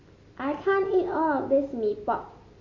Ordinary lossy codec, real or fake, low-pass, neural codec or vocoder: MP3, 32 kbps; real; 7.2 kHz; none